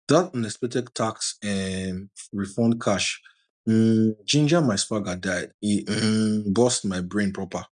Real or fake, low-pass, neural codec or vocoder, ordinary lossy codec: real; 9.9 kHz; none; none